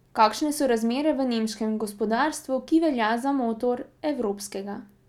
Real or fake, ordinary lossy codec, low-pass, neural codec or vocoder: real; none; 19.8 kHz; none